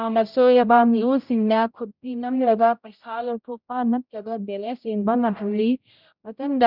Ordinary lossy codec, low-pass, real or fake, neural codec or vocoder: none; 5.4 kHz; fake; codec, 16 kHz, 0.5 kbps, X-Codec, HuBERT features, trained on general audio